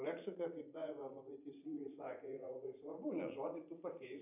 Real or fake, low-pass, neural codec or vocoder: fake; 3.6 kHz; vocoder, 44.1 kHz, 80 mel bands, Vocos